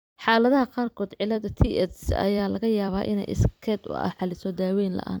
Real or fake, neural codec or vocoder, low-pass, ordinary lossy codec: fake; vocoder, 44.1 kHz, 128 mel bands every 256 samples, BigVGAN v2; none; none